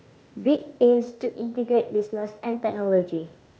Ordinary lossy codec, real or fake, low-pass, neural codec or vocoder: none; fake; none; codec, 16 kHz, 0.8 kbps, ZipCodec